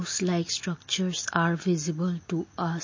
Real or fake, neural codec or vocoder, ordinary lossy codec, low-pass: real; none; MP3, 32 kbps; 7.2 kHz